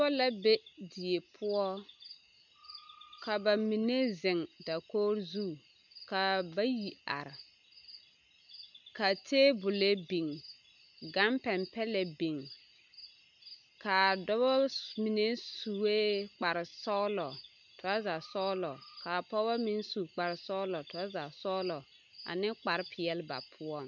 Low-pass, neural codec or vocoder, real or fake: 7.2 kHz; none; real